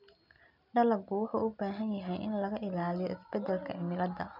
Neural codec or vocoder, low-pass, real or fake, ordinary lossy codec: none; 5.4 kHz; real; none